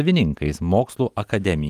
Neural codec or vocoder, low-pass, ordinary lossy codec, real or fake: none; 14.4 kHz; Opus, 24 kbps; real